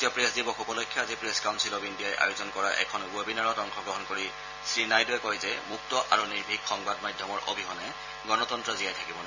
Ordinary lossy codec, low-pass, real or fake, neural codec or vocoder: none; 7.2 kHz; fake; vocoder, 44.1 kHz, 128 mel bands every 256 samples, BigVGAN v2